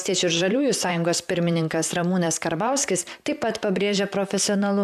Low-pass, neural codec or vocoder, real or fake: 14.4 kHz; vocoder, 44.1 kHz, 128 mel bands, Pupu-Vocoder; fake